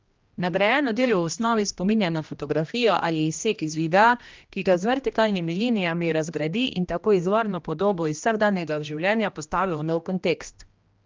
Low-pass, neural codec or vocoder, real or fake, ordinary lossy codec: 7.2 kHz; codec, 16 kHz, 1 kbps, X-Codec, HuBERT features, trained on general audio; fake; Opus, 32 kbps